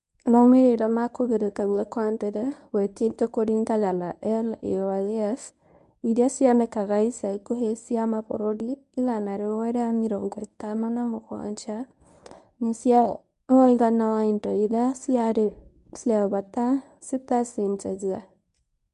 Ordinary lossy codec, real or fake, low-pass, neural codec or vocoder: none; fake; 10.8 kHz; codec, 24 kHz, 0.9 kbps, WavTokenizer, medium speech release version 1